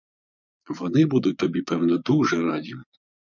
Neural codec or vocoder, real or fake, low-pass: vocoder, 22.05 kHz, 80 mel bands, Vocos; fake; 7.2 kHz